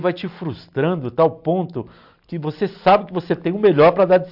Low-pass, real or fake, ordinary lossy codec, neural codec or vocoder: 5.4 kHz; real; AAC, 48 kbps; none